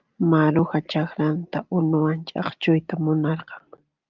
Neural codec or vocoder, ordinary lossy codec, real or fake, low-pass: none; Opus, 24 kbps; real; 7.2 kHz